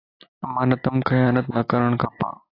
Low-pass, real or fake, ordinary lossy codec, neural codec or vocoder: 5.4 kHz; real; AAC, 24 kbps; none